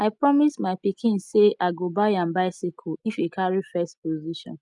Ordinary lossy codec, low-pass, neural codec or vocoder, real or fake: none; 10.8 kHz; none; real